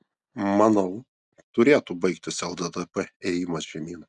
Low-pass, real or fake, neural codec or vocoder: 10.8 kHz; real; none